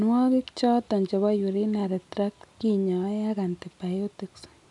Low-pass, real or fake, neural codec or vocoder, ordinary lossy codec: 10.8 kHz; real; none; none